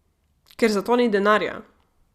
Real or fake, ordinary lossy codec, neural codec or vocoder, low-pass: real; Opus, 64 kbps; none; 14.4 kHz